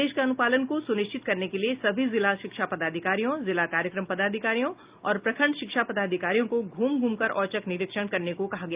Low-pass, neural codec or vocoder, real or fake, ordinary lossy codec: 3.6 kHz; none; real; Opus, 24 kbps